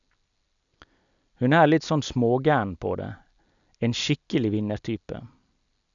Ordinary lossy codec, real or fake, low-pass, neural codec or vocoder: none; real; 7.2 kHz; none